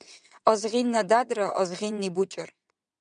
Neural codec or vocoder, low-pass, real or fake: vocoder, 22.05 kHz, 80 mel bands, WaveNeXt; 9.9 kHz; fake